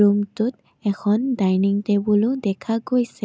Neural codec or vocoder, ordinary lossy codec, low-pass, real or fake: none; none; none; real